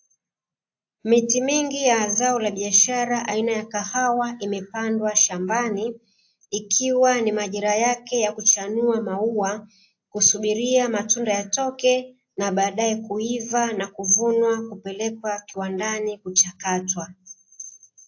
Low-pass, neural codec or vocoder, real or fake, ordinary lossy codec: 7.2 kHz; none; real; AAC, 48 kbps